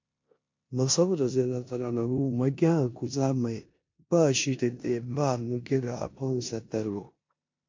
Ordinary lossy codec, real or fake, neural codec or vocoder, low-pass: MP3, 48 kbps; fake; codec, 16 kHz in and 24 kHz out, 0.9 kbps, LongCat-Audio-Codec, four codebook decoder; 7.2 kHz